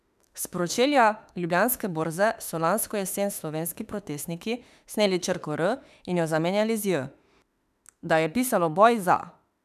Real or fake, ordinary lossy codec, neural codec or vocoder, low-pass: fake; none; autoencoder, 48 kHz, 32 numbers a frame, DAC-VAE, trained on Japanese speech; 14.4 kHz